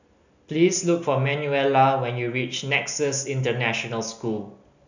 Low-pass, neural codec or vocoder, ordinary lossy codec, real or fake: 7.2 kHz; none; none; real